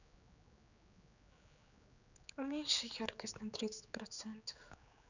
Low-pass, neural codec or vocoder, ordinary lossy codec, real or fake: 7.2 kHz; codec, 16 kHz, 4 kbps, X-Codec, HuBERT features, trained on general audio; none; fake